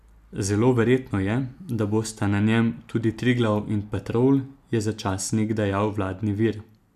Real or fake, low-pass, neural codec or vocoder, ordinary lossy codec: real; 14.4 kHz; none; none